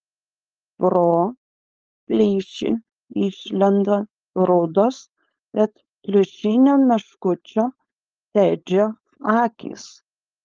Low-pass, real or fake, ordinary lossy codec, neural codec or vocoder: 7.2 kHz; fake; Opus, 24 kbps; codec, 16 kHz, 4.8 kbps, FACodec